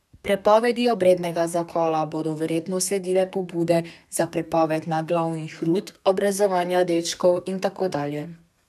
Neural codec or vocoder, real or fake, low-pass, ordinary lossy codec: codec, 32 kHz, 1.9 kbps, SNAC; fake; 14.4 kHz; none